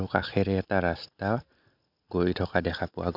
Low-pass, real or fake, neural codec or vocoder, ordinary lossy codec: 5.4 kHz; real; none; none